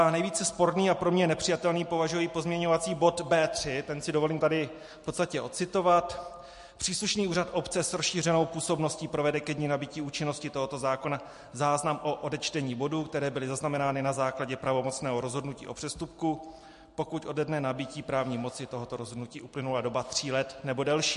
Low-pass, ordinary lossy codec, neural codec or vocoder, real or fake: 14.4 kHz; MP3, 48 kbps; none; real